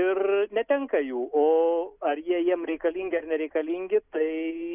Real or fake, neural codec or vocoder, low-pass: real; none; 3.6 kHz